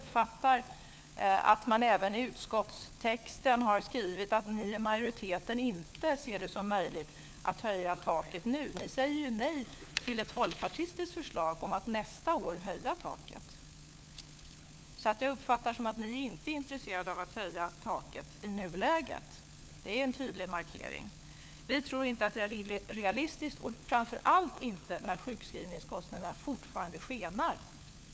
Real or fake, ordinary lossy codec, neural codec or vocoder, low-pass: fake; none; codec, 16 kHz, 4 kbps, FunCodec, trained on LibriTTS, 50 frames a second; none